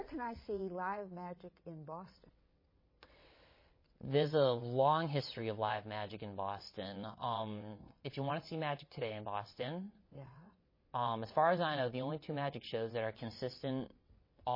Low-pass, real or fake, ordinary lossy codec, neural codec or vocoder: 5.4 kHz; fake; MP3, 24 kbps; vocoder, 22.05 kHz, 80 mel bands, WaveNeXt